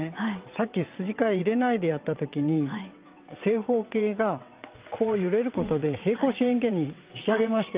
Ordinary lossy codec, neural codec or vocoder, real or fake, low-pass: Opus, 24 kbps; vocoder, 22.05 kHz, 80 mel bands, WaveNeXt; fake; 3.6 kHz